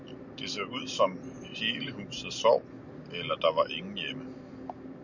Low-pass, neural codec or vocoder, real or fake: 7.2 kHz; none; real